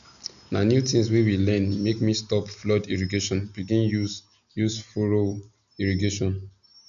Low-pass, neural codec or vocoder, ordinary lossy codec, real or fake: 7.2 kHz; none; none; real